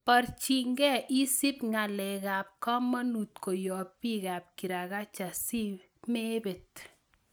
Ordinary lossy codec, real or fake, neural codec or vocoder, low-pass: none; real; none; none